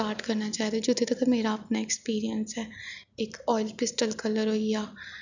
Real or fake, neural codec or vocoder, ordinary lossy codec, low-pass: real; none; none; 7.2 kHz